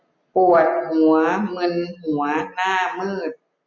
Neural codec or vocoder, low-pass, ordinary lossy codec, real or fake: none; 7.2 kHz; none; real